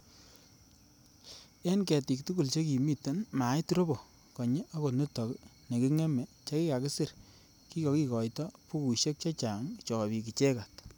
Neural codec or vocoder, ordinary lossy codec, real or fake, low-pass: none; none; real; none